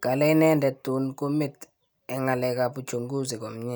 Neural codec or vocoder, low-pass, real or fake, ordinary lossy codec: none; none; real; none